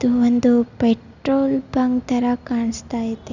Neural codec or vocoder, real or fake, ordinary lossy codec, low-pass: none; real; none; 7.2 kHz